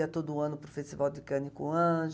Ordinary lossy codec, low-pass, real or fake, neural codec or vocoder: none; none; real; none